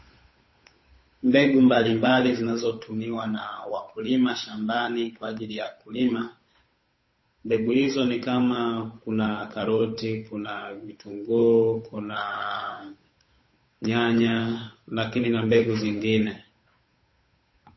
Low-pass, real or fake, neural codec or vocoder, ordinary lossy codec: 7.2 kHz; fake; codec, 16 kHz, 8 kbps, FunCodec, trained on Chinese and English, 25 frames a second; MP3, 24 kbps